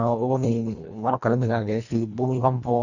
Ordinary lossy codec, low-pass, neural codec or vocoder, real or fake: none; 7.2 kHz; codec, 24 kHz, 1.5 kbps, HILCodec; fake